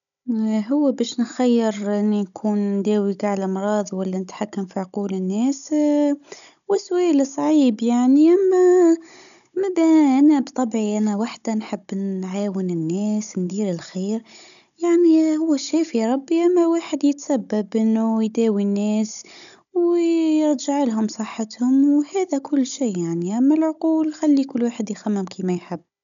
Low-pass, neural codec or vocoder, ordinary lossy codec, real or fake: 7.2 kHz; codec, 16 kHz, 16 kbps, FunCodec, trained on Chinese and English, 50 frames a second; none; fake